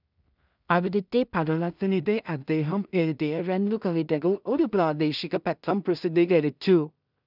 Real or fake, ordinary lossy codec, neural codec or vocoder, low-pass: fake; none; codec, 16 kHz in and 24 kHz out, 0.4 kbps, LongCat-Audio-Codec, two codebook decoder; 5.4 kHz